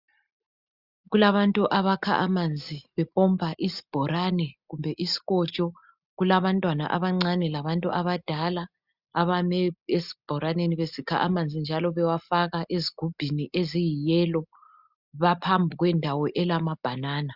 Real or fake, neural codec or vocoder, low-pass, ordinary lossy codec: real; none; 5.4 kHz; Opus, 64 kbps